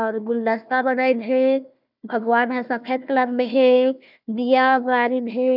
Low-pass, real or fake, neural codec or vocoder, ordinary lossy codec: 5.4 kHz; fake; codec, 16 kHz, 1 kbps, FunCodec, trained on Chinese and English, 50 frames a second; none